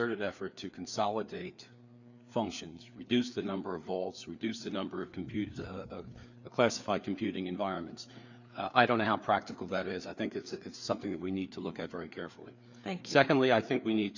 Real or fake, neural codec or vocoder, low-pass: fake; codec, 16 kHz, 4 kbps, FreqCodec, larger model; 7.2 kHz